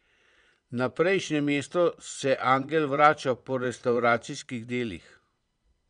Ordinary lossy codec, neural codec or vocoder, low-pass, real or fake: none; vocoder, 22.05 kHz, 80 mel bands, Vocos; 9.9 kHz; fake